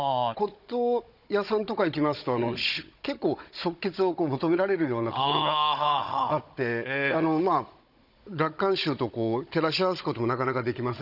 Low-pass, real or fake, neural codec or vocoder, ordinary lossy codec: 5.4 kHz; fake; codec, 16 kHz, 16 kbps, FunCodec, trained on Chinese and English, 50 frames a second; none